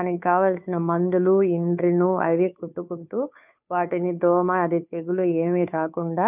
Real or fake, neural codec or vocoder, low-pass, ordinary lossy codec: fake; codec, 16 kHz, 2 kbps, FunCodec, trained on Chinese and English, 25 frames a second; 3.6 kHz; none